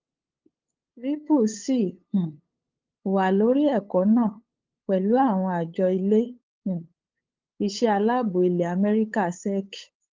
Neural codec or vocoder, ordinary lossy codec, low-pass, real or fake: codec, 16 kHz, 8 kbps, FunCodec, trained on LibriTTS, 25 frames a second; Opus, 32 kbps; 7.2 kHz; fake